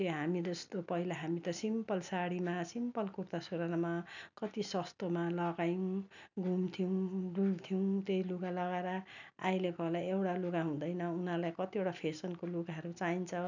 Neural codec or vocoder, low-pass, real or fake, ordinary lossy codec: none; 7.2 kHz; real; none